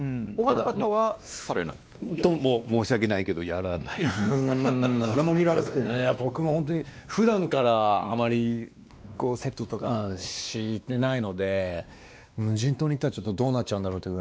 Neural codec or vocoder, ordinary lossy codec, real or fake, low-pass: codec, 16 kHz, 2 kbps, X-Codec, WavLM features, trained on Multilingual LibriSpeech; none; fake; none